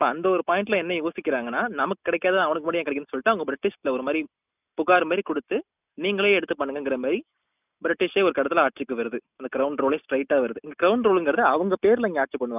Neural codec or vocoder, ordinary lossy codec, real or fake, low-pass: none; none; real; 3.6 kHz